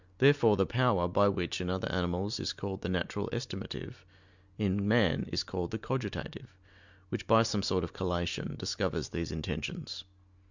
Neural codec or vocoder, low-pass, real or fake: none; 7.2 kHz; real